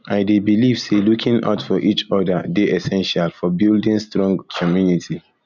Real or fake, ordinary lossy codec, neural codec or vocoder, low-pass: real; none; none; 7.2 kHz